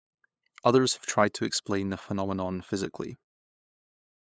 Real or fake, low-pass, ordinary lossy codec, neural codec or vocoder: fake; none; none; codec, 16 kHz, 8 kbps, FunCodec, trained on LibriTTS, 25 frames a second